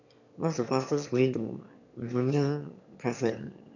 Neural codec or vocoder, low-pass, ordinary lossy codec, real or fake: autoencoder, 22.05 kHz, a latent of 192 numbers a frame, VITS, trained on one speaker; 7.2 kHz; none; fake